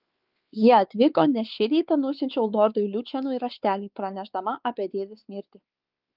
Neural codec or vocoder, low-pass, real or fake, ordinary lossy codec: codec, 16 kHz, 4 kbps, X-Codec, WavLM features, trained on Multilingual LibriSpeech; 5.4 kHz; fake; Opus, 24 kbps